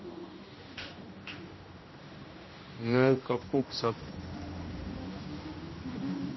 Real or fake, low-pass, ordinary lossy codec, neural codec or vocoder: fake; 7.2 kHz; MP3, 24 kbps; codec, 16 kHz, 1 kbps, X-Codec, HuBERT features, trained on general audio